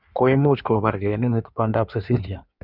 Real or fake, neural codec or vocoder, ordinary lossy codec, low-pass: fake; codec, 16 kHz in and 24 kHz out, 1.1 kbps, FireRedTTS-2 codec; none; 5.4 kHz